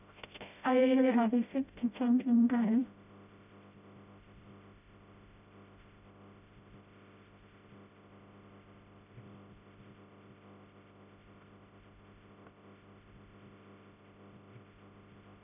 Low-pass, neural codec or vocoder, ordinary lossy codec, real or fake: 3.6 kHz; codec, 16 kHz, 0.5 kbps, FreqCodec, smaller model; none; fake